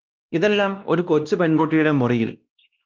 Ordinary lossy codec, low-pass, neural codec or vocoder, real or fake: Opus, 32 kbps; 7.2 kHz; codec, 16 kHz, 1 kbps, X-Codec, WavLM features, trained on Multilingual LibriSpeech; fake